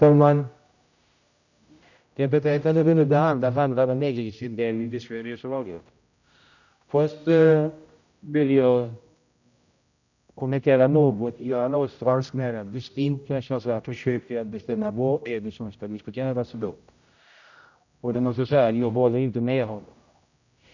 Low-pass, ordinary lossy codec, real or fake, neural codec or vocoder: 7.2 kHz; none; fake; codec, 16 kHz, 0.5 kbps, X-Codec, HuBERT features, trained on general audio